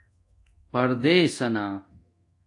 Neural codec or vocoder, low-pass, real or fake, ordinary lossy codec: codec, 24 kHz, 0.9 kbps, DualCodec; 10.8 kHz; fake; AAC, 48 kbps